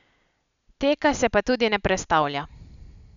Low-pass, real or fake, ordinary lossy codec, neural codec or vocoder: 7.2 kHz; real; none; none